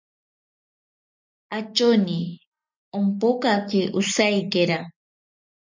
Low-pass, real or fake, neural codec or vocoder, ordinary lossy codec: 7.2 kHz; real; none; MP3, 64 kbps